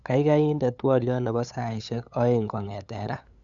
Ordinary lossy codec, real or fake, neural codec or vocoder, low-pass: none; fake; codec, 16 kHz, 8 kbps, FunCodec, trained on LibriTTS, 25 frames a second; 7.2 kHz